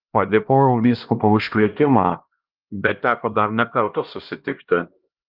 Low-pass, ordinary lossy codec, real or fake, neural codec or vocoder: 5.4 kHz; Opus, 32 kbps; fake; codec, 16 kHz, 1 kbps, X-Codec, HuBERT features, trained on LibriSpeech